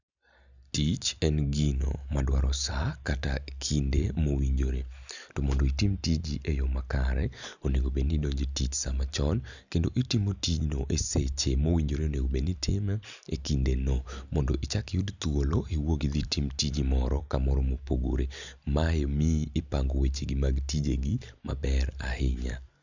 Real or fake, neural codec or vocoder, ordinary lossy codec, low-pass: real; none; none; 7.2 kHz